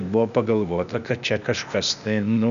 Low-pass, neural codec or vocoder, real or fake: 7.2 kHz; codec, 16 kHz, 0.8 kbps, ZipCodec; fake